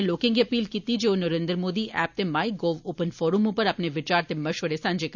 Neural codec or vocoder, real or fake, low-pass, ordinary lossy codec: none; real; 7.2 kHz; none